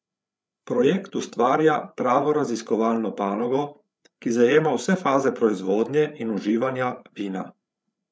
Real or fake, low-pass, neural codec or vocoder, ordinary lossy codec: fake; none; codec, 16 kHz, 8 kbps, FreqCodec, larger model; none